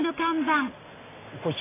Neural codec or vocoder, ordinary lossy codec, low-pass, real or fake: none; AAC, 16 kbps; 3.6 kHz; real